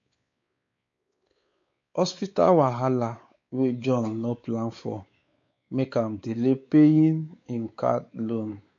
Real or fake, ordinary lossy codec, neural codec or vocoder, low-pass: fake; MP3, 48 kbps; codec, 16 kHz, 4 kbps, X-Codec, WavLM features, trained on Multilingual LibriSpeech; 7.2 kHz